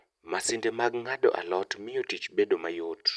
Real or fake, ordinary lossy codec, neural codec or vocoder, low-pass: real; none; none; none